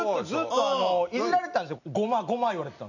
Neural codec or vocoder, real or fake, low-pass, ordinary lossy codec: none; real; 7.2 kHz; none